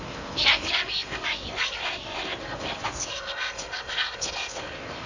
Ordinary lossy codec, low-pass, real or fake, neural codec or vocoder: none; 7.2 kHz; fake; codec, 16 kHz in and 24 kHz out, 0.8 kbps, FocalCodec, streaming, 65536 codes